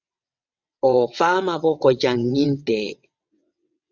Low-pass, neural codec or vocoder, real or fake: 7.2 kHz; vocoder, 22.05 kHz, 80 mel bands, WaveNeXt; fake